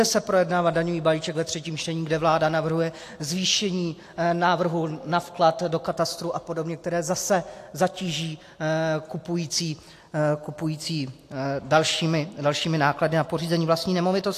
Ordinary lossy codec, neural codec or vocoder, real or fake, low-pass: AAC, 64 kbps; none; real; 14.4 kHz